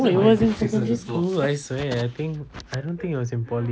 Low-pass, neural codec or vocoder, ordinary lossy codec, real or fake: none; none; none; real